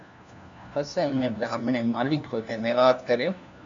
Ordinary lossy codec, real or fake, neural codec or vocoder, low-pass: MP3, 64 kbps; fake; codec, 16 kHz, 1 kbps, FunCodec, trained on LibriTTS, 50 frames a second; 7.2 kHz